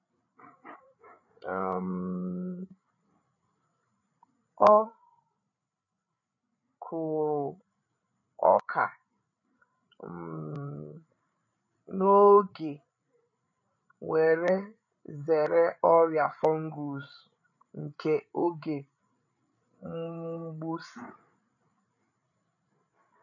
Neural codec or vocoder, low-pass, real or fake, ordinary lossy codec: codec, 16 kHz, 8 kbps, FreqCodec, larger model; 7.2 kHz; fake; none